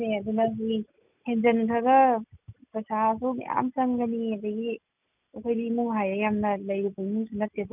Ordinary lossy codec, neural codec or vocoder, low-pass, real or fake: none; none; 3.6 kHz; real